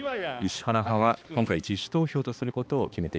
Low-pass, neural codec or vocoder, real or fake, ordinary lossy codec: none; codec, 16 kHz, 2 kbps, X-Codec, HuBERT features, trained on balanced general audio; fake; none